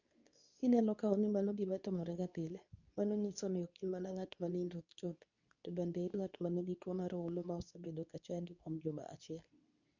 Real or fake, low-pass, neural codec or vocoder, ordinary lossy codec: fake; 7.2 kHz; codec, 24 kHz, 0.9 kbps, WavTokenizer, medium speech release version 2; none